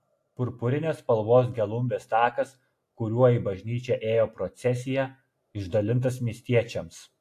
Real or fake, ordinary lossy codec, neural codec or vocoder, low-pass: real; AAC, 64 kbps; none; 14.4 kHz